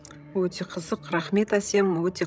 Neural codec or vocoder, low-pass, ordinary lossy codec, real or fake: codec, 16 kHz, 16 kbps, FreqCodec, larger model; none; none; fake